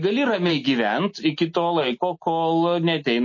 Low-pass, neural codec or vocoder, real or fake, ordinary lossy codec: 7.2 kHz; none; real; MP3, 32 kbps